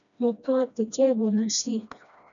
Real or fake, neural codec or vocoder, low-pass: fake; codec, 16 kHz, 1 kbps, FreqCodec, smaller model; 7.2 kHz